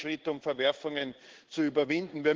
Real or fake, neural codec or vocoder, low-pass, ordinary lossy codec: fake; codec, 16 kHz in and 24 kHz out, 1 kbps, XY-Tokenizer; 7.2 kHz; Opus, 16 kbps